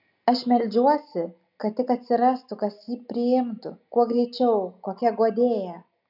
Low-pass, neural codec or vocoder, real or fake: 5.4 kHz; none; real